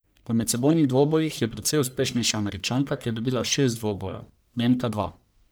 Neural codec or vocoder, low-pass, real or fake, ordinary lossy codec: codec, 44.1 kHz, 1.7 kbps, Pupu-Codec; none; fake; none